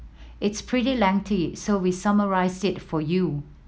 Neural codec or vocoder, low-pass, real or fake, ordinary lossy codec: none; none; real; none